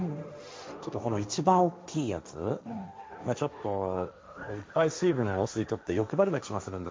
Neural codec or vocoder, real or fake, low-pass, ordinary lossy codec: codec, 16 kHz, 1.1 kbps, Voila-Tokenizer; fake; none; none